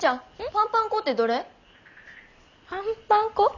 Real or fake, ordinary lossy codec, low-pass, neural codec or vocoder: real; none; 7.2 kHz; none